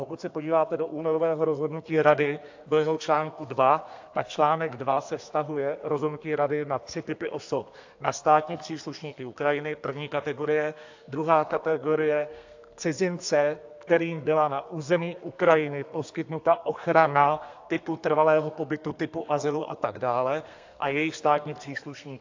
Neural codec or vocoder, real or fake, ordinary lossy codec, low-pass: codec, 32 kHz, 1.9 kbps, SNAC; fake; AAC, 48 kbps; 7.2 kHz